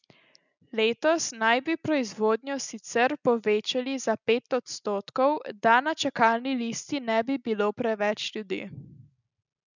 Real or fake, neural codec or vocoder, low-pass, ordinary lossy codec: real; none; 7.2 kHz; none